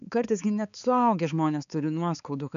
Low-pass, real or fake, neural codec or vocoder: 7.2 kHz; fake; codec, 16 kHz, 4 kbps, X-Codec, HuBERT features, trained on balanced general audio